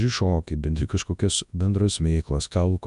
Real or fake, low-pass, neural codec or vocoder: fake; 10.8 kHz; codec, 24 kHz, 0.9 kbps, WavTokenizer, large speech release